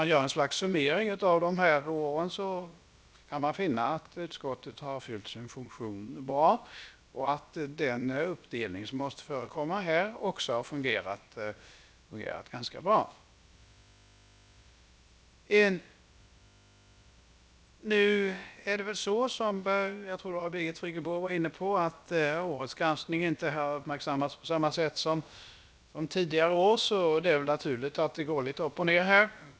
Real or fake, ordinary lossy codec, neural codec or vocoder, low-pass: fake; none; codec, 16 kHz, about 1 kbps, DyCAST, with the encoder's durations; none